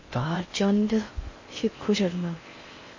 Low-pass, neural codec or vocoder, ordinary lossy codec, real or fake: 7.2 kHz; codec, 16 kHz in and 24 kHz out, 0.6 kbps, FocalCodec, streaming, 4096 codes; MP3, 32 kbps; fake